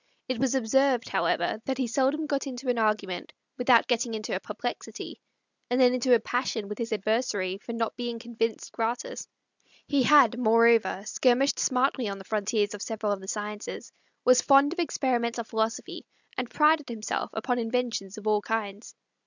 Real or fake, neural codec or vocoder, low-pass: real; none; 7.2 kHz